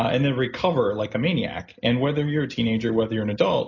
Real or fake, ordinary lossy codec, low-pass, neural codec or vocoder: real; MP3, 48 kbps; 7.2 kHz; none